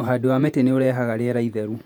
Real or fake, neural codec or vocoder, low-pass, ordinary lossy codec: fake; vocoder, 48 kHz, 128 mel bands, Vocos; 19.8 kHz; none